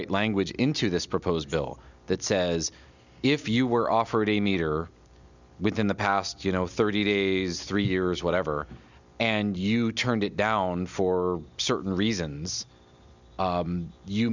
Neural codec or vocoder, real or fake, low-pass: none; real; 7.2 kHz